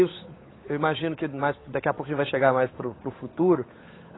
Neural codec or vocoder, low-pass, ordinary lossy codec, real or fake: codec, 16 kHz, 8 kbps, FunCodec, trained on Chinese and English, 25 frames a second; 7.2 kHz; AAC, 16 kbps; fake